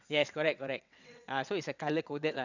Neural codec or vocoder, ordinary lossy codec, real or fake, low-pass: vocoder, 22.05 kHz, 80 mel bands, WaveNeXt; none; fake; 7.2 kHz